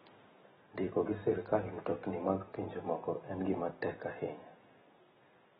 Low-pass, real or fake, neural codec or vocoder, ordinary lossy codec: 10.8 kHz; real; none; AAC, 16 kbps